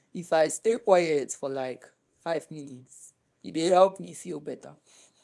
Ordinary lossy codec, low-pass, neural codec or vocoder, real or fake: none; none; codec, 24 kHz, 0.9 kbps, WavTokenizer, small release; fake